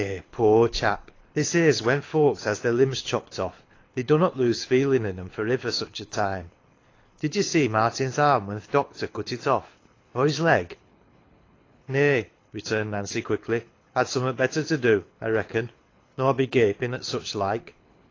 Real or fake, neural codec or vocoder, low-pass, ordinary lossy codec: fake; codec, 24 kHz, 6 kbps, HILCodec; 7.2 kHz; AAC, 32 kbps